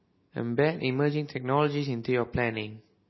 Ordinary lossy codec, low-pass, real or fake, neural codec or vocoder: MP3, 24 kbps; 7.2 kHz; real; none